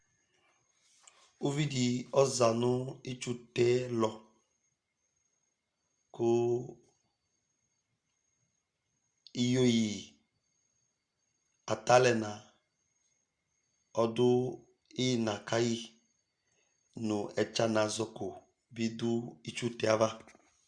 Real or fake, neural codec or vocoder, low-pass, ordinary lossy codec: real; none; 9.9 kHz; Opus, 64 kbps